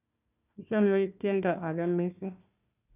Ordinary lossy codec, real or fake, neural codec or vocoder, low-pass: none; fake; codec, 16 kHz, 1 kbps, FunCodec, trained on Chinese and English, 50 frames a second; 3.6 kHz